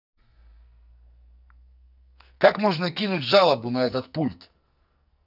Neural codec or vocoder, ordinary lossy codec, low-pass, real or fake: codec, 44.1 kHz, 2.6 kbps, SNAC; none; 5.4 kHz; fake